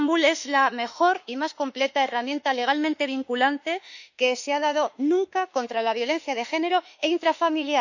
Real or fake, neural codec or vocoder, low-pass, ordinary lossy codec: fake; autoencoder, 48 kHz, 32 numbers a frame, DAC-VAE, trained on Japanese speech; 7.2 kHz; none